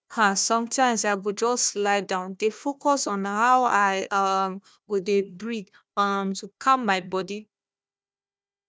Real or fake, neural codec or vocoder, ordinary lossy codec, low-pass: fake; codec, 16 kHz, 1 kbps, FunCodec, trained on Chinese and English, 50 frames a second; none; none